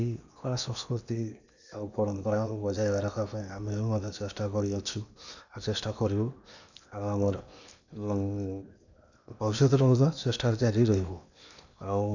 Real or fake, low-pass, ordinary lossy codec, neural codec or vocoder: fake; 7.2 kHz; none; codec, 16 kHz in and 24 kHz out, 0.8 kbps, FocalCodec, streaming, 65536 codes